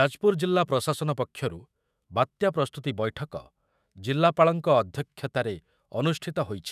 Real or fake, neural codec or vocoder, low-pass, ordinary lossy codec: fake; autoencoder, 48 kHz, 128 numbers a frame, DAC-VAE, trained on Japanese speech; 14.4 kHz; none